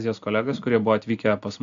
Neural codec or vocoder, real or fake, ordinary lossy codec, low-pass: none; real; AAC, 64 kbps; 7.2 kHz